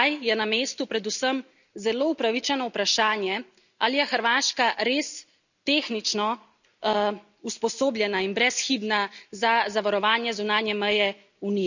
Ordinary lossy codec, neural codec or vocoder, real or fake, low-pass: none; none; real; 7.2 kHz